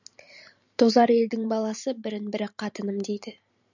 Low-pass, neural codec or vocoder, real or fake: 7.2 kHz; vocoder, 44.1 kHz, 128 mel bands every 512 samples, BigVGAN v2; fake